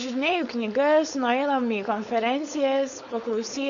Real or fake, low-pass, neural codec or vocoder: fake; 7.2 kHz; codec, 16 kHz, 4.8 kbps, FACodec